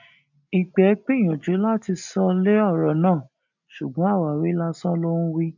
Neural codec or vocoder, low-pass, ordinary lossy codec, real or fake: none; 7.2 kHz; none; real